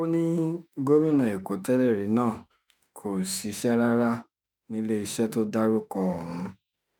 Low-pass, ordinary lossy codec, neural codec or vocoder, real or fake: none; none; autoencoder, 48 kHz, 32 numbers a frame, DAC-VAE, trained on Japanese speech; fake